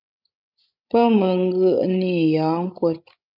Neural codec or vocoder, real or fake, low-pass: codec, 16 kHz, 16 kbps, FreqCodec, larger model; fake; 5.4 kHz